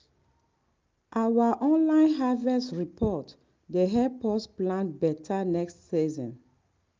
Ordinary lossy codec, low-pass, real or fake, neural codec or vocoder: Opus, 24 kbps; 7.2 kHz; real; none